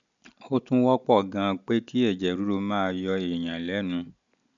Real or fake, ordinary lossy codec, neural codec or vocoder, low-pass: real; none; none; 7.2 kHz